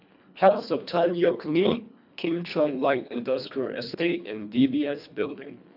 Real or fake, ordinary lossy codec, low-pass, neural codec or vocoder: fake; none; 5.4 kHz; codec, 24 kHz, 1.5 kbps, HILCodec